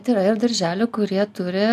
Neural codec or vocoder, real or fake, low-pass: none; real; 14.4 kHz